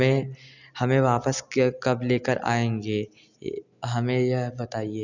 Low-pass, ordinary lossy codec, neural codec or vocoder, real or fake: 7.2 kHz; none; none; real